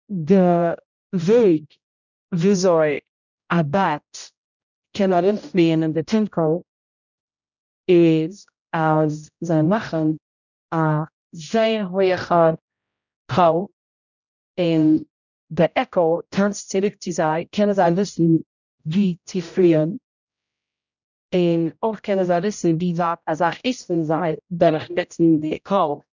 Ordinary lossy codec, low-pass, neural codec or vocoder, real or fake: none; 7.2 kHz; codec, 16 kHz, 0.5 kbps, X-Codec, HuBERT features, trained on general audio; fake